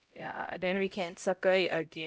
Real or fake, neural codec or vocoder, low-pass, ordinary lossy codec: fake; codec, 16 kHz, 0.5 kbps, X-Codec, HuBERT features, trained on LibriSpeech; none; none